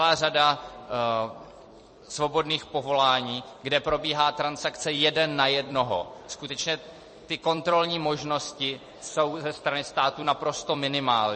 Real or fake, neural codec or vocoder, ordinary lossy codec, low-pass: fake; vocoder, 44.1 kHz, 128 mel bands every 256 samples, BigVGAN v2; MP3, 32 kbps; 10.8 kHz